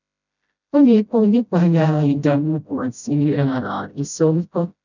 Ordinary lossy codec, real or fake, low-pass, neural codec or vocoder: none; fake; 7.2 kHz; codec, 16 kHz, 0.5 kbps, FreqCodec, smaller model